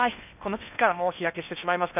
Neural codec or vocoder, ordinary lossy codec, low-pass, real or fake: codec, 16 kHz in and 24 kHz out, 0.8 kbps, FocalCodec, streaming, 65536 codes; none; 3.6 kHz; fake